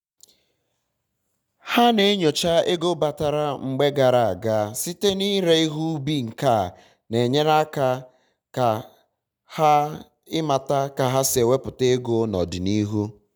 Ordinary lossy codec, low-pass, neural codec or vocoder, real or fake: none; 19.8 kHz; none; real